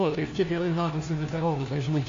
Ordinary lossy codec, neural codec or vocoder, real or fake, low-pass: AAC, 48 kbps; codec, 16 kHz, 1 kbps, FunCodec, trained on LibriTTS, 50 frames a second; fake; 7.2 kHz